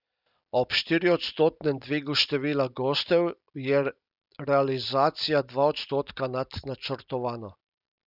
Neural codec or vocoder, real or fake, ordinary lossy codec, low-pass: none; real; none; 5.4 kHz